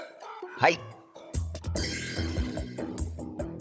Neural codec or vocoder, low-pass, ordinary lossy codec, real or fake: codec, 16 kHz, 16 kbps, FunCodec, trained on Chinese and English, 50 frames a second; none; none; fake